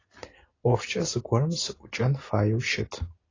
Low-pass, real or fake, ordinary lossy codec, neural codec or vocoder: 7.2 kHz; real; AAC, 32 kbps; none